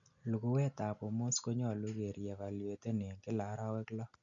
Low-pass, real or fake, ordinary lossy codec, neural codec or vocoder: 7.2 kHz; real; none; none